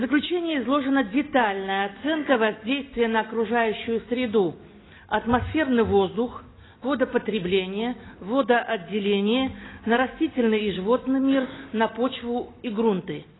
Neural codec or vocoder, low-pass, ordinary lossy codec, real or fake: none; 7.2 kHz; AAC, 16 kbps; real